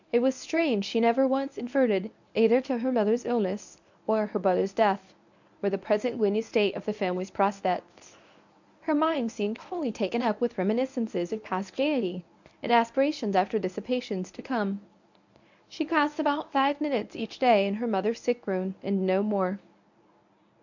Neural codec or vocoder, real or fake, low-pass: codec, 24 kHz, 0.9 kbps, WavTokenizer, medium speech release version 1; fake; 7.2 kHz